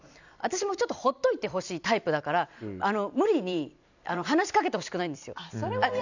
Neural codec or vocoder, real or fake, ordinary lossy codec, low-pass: vocoder, 44.1 kHz, 128 mel bands every 256 samples, BigVGAN v2; fake; none; 7.2 kHz